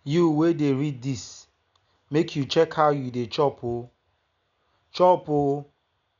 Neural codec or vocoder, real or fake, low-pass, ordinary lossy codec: none; real; 7.2 kHz; none